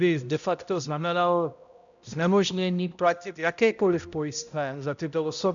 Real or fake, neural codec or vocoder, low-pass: fake; codec, 16 kHz, 0.5 kbps, X-Codec, HuBERT features, trained on balanced general audio; 7.2 kHz